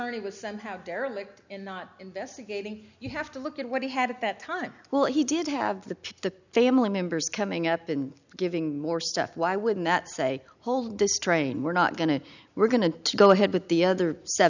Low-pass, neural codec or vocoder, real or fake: 7.2 kHz; none; real